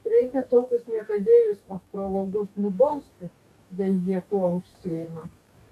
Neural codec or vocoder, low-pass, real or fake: codec, 44.1 kHz, 2.6 kbps, DAC; 14.4 kHz; fake